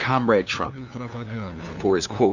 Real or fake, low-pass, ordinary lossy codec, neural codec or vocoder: fake; 7.2 kHz; Opus, 64 kbps; codec, 16 kHz, 2 kbps, FunCodec, trained on LibriTTS, 25 frames a second